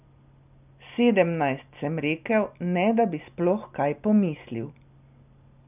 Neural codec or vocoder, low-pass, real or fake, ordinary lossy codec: none; 3.6 kHz; real; none